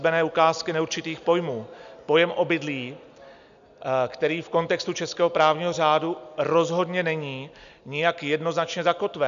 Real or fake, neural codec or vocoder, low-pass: real; none; 7.2 kHz